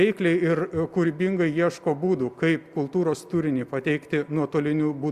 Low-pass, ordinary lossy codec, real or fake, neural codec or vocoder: 14.4 kHz; Opus, 64 kbps; real; none